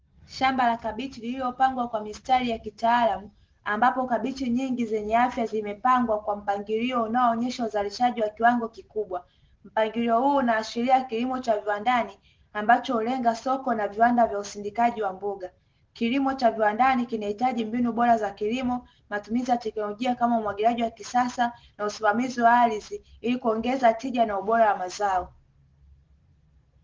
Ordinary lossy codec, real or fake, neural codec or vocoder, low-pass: Opus, 16 kbps; real; none; 7.2 kHz